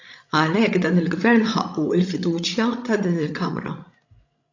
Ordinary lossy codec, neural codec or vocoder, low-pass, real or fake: AAC, 48 kbps; codec, 16 kHz, 16 kbps, FreqCodec, larger model; 7.2 kHz; fake